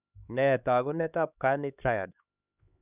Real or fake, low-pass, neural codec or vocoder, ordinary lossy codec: fake; 3.6 kHz; codec, 16 kHz, 2 kbps, X-Codec, HuBERT features, trained on LibriSpeech; none